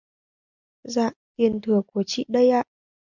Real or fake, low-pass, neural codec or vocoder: real; 7.2 kHz; none